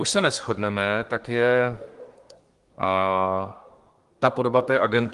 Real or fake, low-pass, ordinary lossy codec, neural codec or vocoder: fake; 10.8 kHz; Opus, 24 kbps; codec, 24 kHz, 1 kbps, SNAC